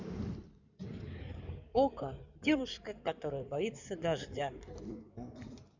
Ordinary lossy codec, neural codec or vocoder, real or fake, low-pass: none; codec, 16 kHz in and 24 kHz out, 2.2 kbps, FireRedTTS-2 codec; fake; 7.2 kHz